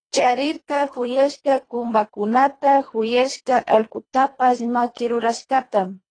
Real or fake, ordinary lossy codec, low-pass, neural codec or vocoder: fake; AAC, 32 kbps; 9.9 kHz; codec, 24 kHz, 1.5 kbps, HILCodec